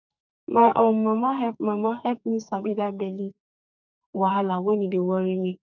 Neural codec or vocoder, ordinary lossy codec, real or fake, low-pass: codec, 44.1 kHz, 2.6 kbps, SNAC; none; fake; 7.2 kHz